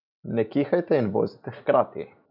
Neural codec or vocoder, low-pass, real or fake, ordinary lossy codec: none; 5.4 kHz; real; none